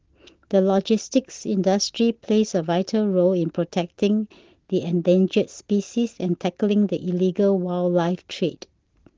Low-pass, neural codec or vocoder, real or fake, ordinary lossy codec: 7.2 kHz; none; real; Opus, 16 kbps